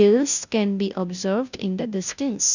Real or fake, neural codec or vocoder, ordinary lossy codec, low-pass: fake; codec, 16 kHz, 0.5 kbps, FunCodec, trained on Chinese and English, 25 frames a second; none; 7.2 kHz